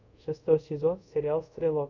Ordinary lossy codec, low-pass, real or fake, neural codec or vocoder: MP3, 64 kbps; 7.2 kHz; fake; codec, 24 kHz, 0.5 kbps, DualCodec